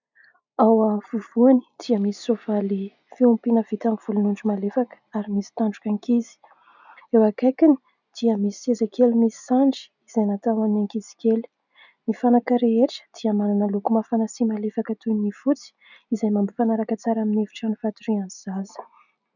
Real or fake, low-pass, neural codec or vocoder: real; 7.2 kHz; none